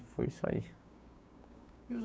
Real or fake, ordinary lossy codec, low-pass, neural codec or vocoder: fake; none; none; codec, 16 kHz, 6 kbps, DAC